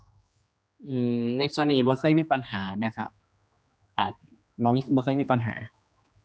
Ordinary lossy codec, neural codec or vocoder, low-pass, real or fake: none; codec, 16 kHz, 2 kbps, X-Codec, HuBERT features, trained on general audio; none; fake